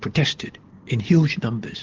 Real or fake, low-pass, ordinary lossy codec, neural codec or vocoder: real; 7.2 kHz; Opus, 16 kbps; none